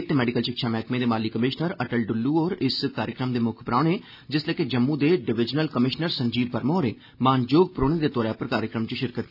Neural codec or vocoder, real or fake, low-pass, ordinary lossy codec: none; real; 5.4 kHz; none